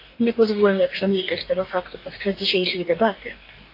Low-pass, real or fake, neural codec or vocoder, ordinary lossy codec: 5.4 kHz; fake; codec, 44.1 kHz, 2.6 kbps, DAC; AAC, 32 kbps